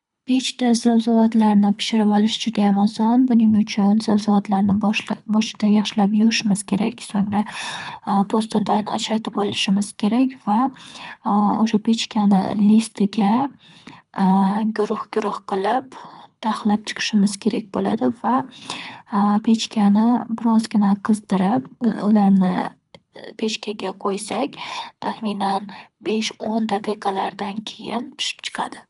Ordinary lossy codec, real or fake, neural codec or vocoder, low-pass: none; fake; codec, 24 kHz, 3 kbps, HILCodec; 10.8 kHz